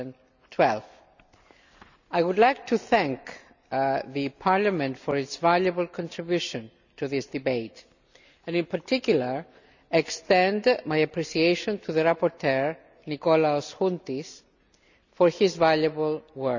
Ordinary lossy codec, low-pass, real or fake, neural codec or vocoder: none; 7.2 kHz; real; none